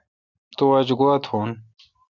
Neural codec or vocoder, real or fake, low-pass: none; real; 7.2 kHz